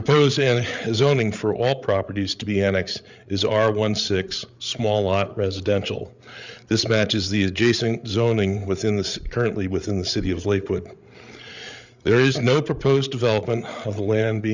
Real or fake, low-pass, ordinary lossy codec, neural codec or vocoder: fake; 7.2 kHz; Opus, 64 kbps; codec, 16 kHz, 8 kbps, FreqCodec, larger model